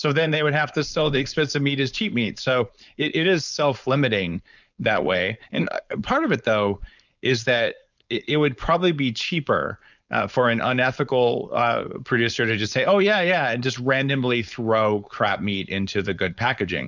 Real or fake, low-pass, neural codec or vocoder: fake; 7.2 kHz; codec, 16 kHz, 4.8 kbps, FACodec